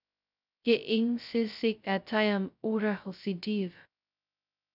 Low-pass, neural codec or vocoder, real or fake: 5.4 kHz; codec, 16 kHz, 0.2 kbps, FocalCodec; fake